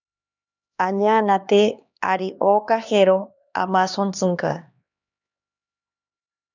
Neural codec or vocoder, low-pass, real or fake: codec, 16 kHz, 2 kbps, X-Codec, HuBERT features, trained on LibriSpeech; 7.2 kHz; fake